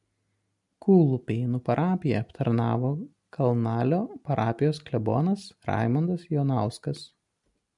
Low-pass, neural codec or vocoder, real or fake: 10.8 kHz; none; real